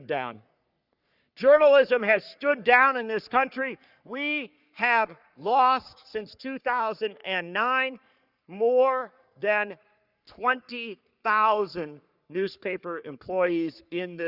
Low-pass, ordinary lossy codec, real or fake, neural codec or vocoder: 5.4 kHz; Opus, 64 kbps; fake; codec, 44.1 kHz, 3.4 kbps, Pupu-Codec